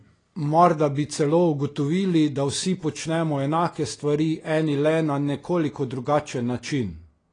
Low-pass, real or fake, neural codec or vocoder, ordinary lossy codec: 9.9 kHz; real; none; AAC, 32 kbps